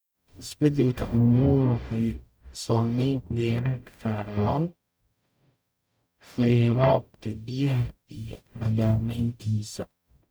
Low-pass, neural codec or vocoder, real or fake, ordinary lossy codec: none; codec, 44.1 kHz, 0.9 kbps, DAC; fake; none